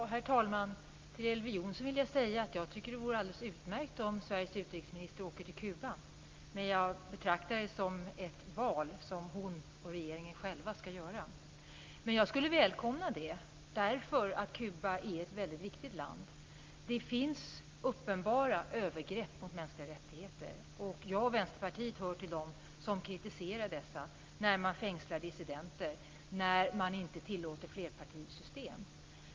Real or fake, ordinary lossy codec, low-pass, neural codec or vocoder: real; Opus, 32 kbps; 7.2 kHz; none